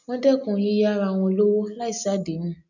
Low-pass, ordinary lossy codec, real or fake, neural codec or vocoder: 7.2 kHz; none; real; none